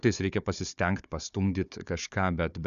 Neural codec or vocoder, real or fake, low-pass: codec, 16 kHz, 4 kbps, FunCodec, trained on Chinese and English, 50 frames a second; fake; 7.2 kHz